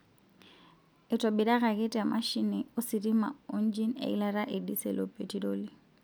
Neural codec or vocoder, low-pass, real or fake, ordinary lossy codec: none; none; real; none